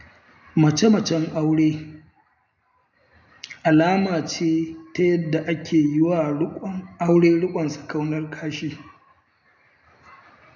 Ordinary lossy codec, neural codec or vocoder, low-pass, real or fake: none; none; 7.2 kHz; real